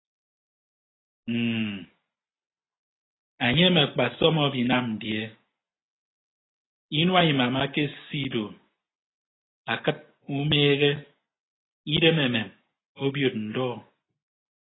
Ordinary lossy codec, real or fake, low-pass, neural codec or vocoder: AAC, 16 kbps; real; 7.2 kHz; none